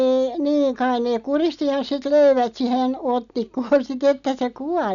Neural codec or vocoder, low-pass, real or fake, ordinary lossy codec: none; 7.2 kHz; real; none